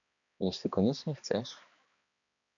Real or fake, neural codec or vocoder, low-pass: fake; codec, 16 kHz, 2 kbps, X-Codec, HuBERT features, trained on balanced general audio; 7.2 kHz